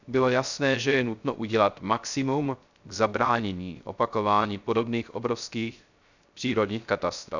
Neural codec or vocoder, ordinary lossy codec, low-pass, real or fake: codec, 16 kHz, 0.3 kbps, FocalCodec; none; 7.2 kHz; fake